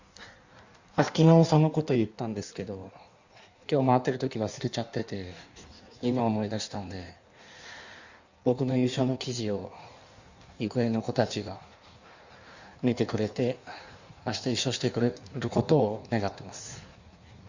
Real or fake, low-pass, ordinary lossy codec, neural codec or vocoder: fake; 7.2 kHz; Opus, 64 kbps; codec, 16 kHz in and 24 kHz out, 1.1 kbps, FireRedTTS-2 codec